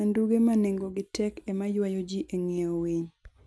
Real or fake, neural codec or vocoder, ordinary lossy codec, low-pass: real; none; none; none